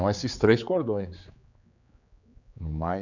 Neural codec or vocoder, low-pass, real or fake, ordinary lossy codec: codec, 16 kHz, 4 kbps, X-Codec, HuBERT features, trained on general audio; 7.2 kHz; fake; none